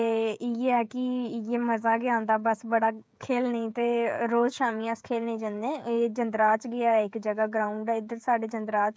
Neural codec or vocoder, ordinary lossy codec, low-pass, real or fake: codec, 16 kHz, 16 kbps, FreqCodec, smaller model; none; none; fake